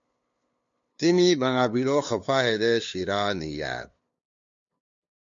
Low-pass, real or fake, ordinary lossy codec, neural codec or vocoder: 7.2 kHz; fake; MP3, 48 kbps; codec, 16 kHz, 2 kbps, FunCodec, trained on LibriTTS, 25 frames a second